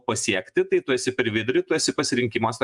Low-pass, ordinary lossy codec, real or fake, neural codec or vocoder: 10.8 kHz; MP3, 96 kbps; fake; vocoder, 48 kHz, 128 mel bands, Vocos